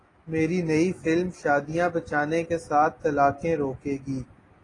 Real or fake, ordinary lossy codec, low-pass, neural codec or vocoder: real; AAC, 32 kbps; 10.8 kHz; none